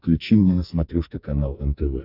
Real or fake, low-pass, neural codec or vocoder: fake; 5.4 kHz; codec, 44.1 kHz, 2.6 kbps, SNAC